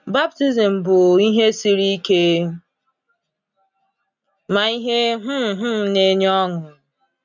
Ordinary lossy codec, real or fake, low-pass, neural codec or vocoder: none; real; 7.2 kHz; none